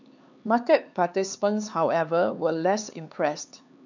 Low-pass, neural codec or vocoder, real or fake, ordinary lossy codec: 7.2 kHz; codec, 16 kHz, 4 kbps, X-Codec, HuBERT features, trained on LibriSpeech; fake; none